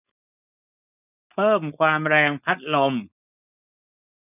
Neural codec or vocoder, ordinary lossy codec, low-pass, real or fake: codec, 16 kHz, 4.8 kbps, FACodec; none; 3.6 kHz; fake